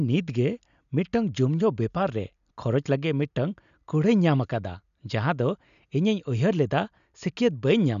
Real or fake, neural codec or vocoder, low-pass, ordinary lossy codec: real; none; 7.2 kHz; none